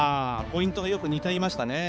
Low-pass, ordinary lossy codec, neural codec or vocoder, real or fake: none; none; codec, 16 kHz, 4 kbps, X-Codec, HuBERT features, trained on balanced general audio; fake